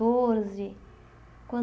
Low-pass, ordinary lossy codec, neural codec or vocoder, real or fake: none; none; none; real